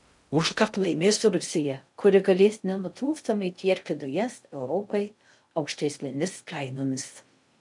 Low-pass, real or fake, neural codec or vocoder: 10.8 kHz; fake; codec, 16 kHz in and 24 kHz out, 0.6 kbps, FocalCodec, streaming, 2048 codes